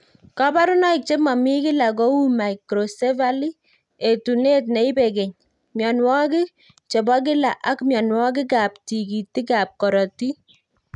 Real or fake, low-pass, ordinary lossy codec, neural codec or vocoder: real; 10.8 kHz; none; none